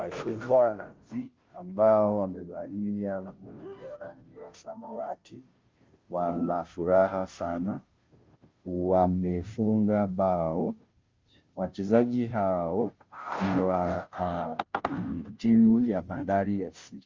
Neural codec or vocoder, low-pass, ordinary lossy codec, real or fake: codec, 16 kHz, 0.5 kbps, FunCodec, trained on Chinese and English, 25 frames a second; 7.2 kHz; Opus, 24 kbps; fake